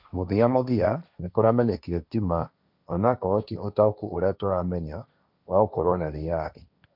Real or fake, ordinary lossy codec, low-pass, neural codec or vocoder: fake; none; 5.4 kHz; codec, 16 kHz, 1.1 kbps, Voila-Tokenizer